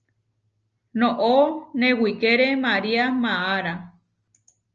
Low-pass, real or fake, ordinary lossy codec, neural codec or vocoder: 7.2 kHz; real; Opus, 24 kbps; none